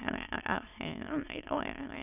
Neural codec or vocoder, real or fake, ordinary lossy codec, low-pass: autoencoder, 22.05 kHz, a latent of 192 numbers a frame, VITS, trained on many speakers; fake; none; 3.6 kHz